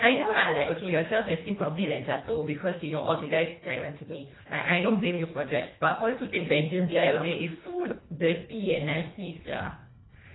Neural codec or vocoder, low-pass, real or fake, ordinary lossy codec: codec, 24 kHz, 1.5 kbps, HILCodec; 7.2 kHz; fake; AAC, 16 kbps